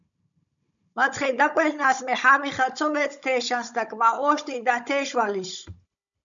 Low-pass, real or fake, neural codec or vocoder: 7.2 kHz; fake; codec, 16 kHz, 16 kbps, FunCodec, trained on Chinese and English, 50 frames a second